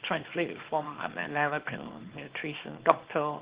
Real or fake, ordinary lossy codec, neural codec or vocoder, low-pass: fake; Opus, 16 kbps; codec, 24 kHz, 0.9 kbps, WavTokenizer, small release; 3.6 kHz